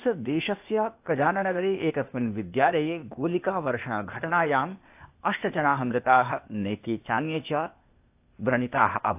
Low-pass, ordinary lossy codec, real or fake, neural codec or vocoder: 3.6 kHz; AAC, 32 kbps; fake; codec, 16 kHz, 0.8 kbps, ZipCodec